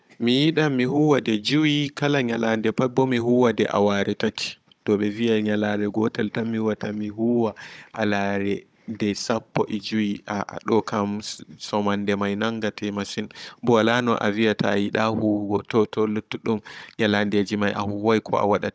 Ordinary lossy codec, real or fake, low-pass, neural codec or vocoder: none; fake; none; codec, 16 kHz, 16 kbps, FunCodec, trained on Chinese and English, 50 frames a second